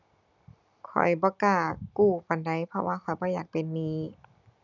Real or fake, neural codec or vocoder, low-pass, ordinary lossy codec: real; none; 7.2 kHz; none